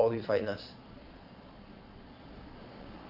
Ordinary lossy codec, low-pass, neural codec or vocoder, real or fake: none; 5.4 kHz; vocoder, 22.05 kHz, 80 mel bands, Vocos; fake